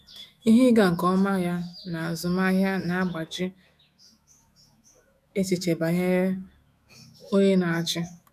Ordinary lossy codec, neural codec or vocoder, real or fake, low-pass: none; autoencoder, 48 kHz, 128 numbers a frame, DAC-VAE, trained on Japanese speech; fake; 14.4 kHz